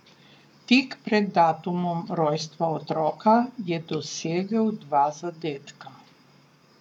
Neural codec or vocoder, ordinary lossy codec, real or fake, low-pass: codec, 44.1 kHz, 7.8 kbps, Pupu-Codec; none; fake; 19.8 kHz